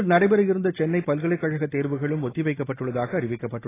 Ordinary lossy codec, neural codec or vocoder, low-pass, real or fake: AAC, 16 kbps; none; 3.6 kHz; real